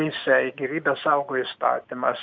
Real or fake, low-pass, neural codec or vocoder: real; 7.2 kHz; none